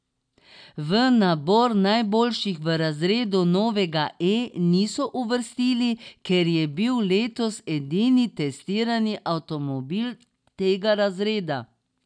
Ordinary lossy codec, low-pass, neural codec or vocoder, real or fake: none; 9.9 kHz; none; real